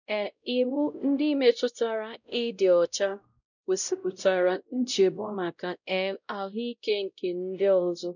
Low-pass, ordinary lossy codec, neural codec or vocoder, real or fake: 7.2 kHz; none; codec, 16 kHz, 0.5 kbps, X-Codec, WavLM features, trained on Multilingual LibriSpeech; fake